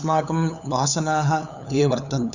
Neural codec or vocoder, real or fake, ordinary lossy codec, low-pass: codec, 16 kHz, 8 kbps, FunCodec, trained on LibriTTS, 25 frames a second; fake; none; 7.2 kHz